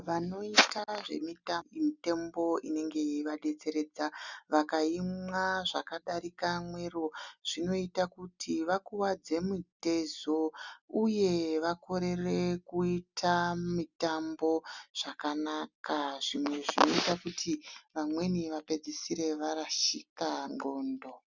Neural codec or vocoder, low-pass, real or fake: none; 7.2 kHz; real